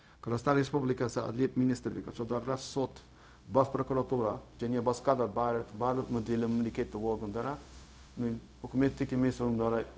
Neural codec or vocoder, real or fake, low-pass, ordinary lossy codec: codec, 16 kHz, 0.4 kbps, LongCat-Audio-Codec; fake; none; none